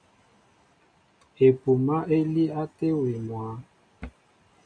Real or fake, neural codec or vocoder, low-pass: real; none; 9.9 kHz